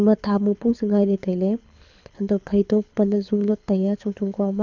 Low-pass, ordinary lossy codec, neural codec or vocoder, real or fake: 7.2 kHz; none; codec, 24 kHz, 6 kbps, HILCodec; fake